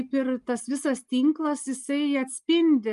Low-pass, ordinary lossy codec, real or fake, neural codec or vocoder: 10.8 kHz; Opus, 32 kbps; real; none